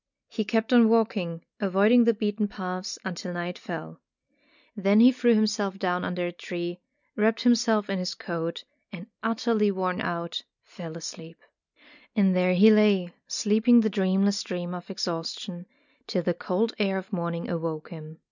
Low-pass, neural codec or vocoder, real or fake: 7.2 kHz; none; real